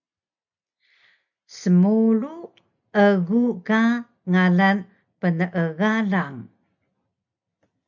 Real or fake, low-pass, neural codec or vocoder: real; 7.2 kHz; none